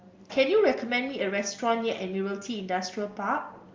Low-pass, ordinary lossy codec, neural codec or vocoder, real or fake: 7.2 kHz; Opus, 24 kbps; none; real